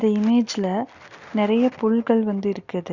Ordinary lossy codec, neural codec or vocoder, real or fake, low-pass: Opus, 64 kbps; none; real; 7.2 kHz